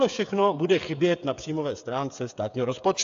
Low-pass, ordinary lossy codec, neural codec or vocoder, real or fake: 7.2 kHz; AAC, 64 kbps; codec, 16 kHz, 8 kbps, FreqCodec, smaller model; fake